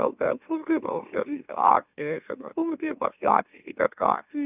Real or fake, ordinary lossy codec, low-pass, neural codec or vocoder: fake; none; 3.6 kHz; autoencoder, 44.1 kHz, a latent of 192 numbers a frame, MeloTTS